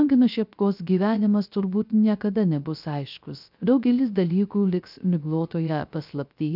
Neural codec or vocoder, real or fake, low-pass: codec, 16 kHz, 0.3 kbps, FocalCodec; fake; 5.4 kHz